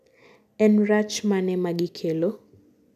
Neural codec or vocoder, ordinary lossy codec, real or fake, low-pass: none; none; real; 14.4 kHz